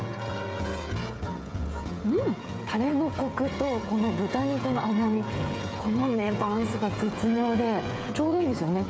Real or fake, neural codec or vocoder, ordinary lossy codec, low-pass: fake; codec, 16 kHz, 16 kbps, FreqCodec, smaller model; none; none